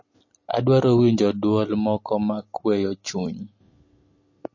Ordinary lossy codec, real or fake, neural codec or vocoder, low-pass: MP3, 32 kbps; real; none; 7.2 kHz